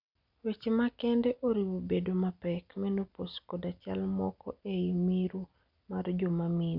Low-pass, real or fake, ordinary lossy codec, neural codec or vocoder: 5.4 kHz; real; none; none